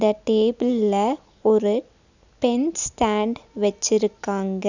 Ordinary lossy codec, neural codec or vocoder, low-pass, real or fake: none; none; 7.2 kHz; real